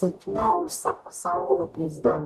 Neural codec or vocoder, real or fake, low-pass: codec, 44.1 kHz, 0.9 kbps, DAC; fake; 14.4 kHz